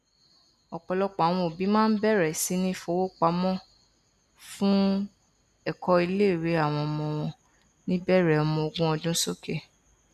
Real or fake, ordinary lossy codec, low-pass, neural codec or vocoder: real; none; 14.4 kHz; none